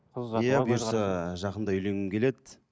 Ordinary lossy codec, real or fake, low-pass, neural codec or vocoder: none; real; none; none